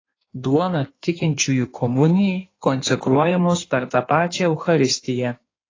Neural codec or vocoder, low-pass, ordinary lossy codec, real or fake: codec, 16 kHz in and 24 kHz out, 1.1 kbps, FireRedTTS-2 codec; 7.2 kHz; AAC, 32 kbps; fake